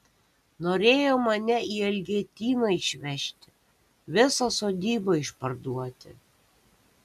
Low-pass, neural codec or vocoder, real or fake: 14.4 kHz; none; real